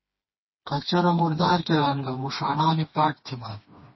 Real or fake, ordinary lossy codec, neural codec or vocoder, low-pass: fake; MP3, 24 kbps; codec, 16 kHz, 2 kbps, FreqCodec, smaller model; 7.2 kHz